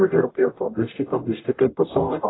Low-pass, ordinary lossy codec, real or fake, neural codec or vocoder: 7.2 kHz; AAC, 16 kbps; fake; codec, 44.1 kHz, 0.9 kbps, DAC